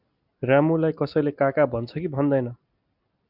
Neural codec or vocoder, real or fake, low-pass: none; real; 5.4 kHz